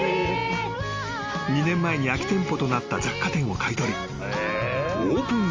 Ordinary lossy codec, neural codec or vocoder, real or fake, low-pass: Opus, 32 kbps; none; real; 7.2 kHz